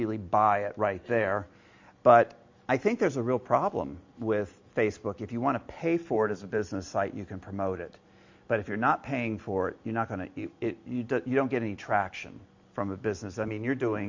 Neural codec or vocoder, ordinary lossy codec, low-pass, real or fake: none; MP3, 48 kbps; 7.2 kHz; real